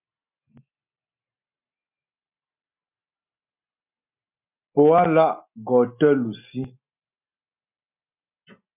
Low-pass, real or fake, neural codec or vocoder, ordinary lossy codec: 3.6 kHz; real; none; MP3, 32 kbps